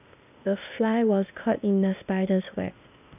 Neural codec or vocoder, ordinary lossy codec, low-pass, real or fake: codec, 16 kHz, 0.8 kbps, ZipCodec; none; 3.6 kHz; fake